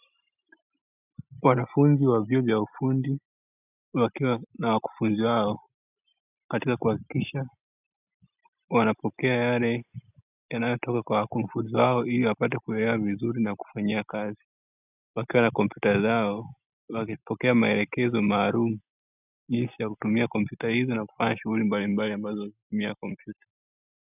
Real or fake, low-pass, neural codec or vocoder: real; 3.6 kHz; none